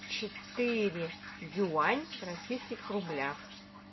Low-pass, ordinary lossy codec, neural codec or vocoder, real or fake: 7.2 kHz; MP3, 24 kbps; none; real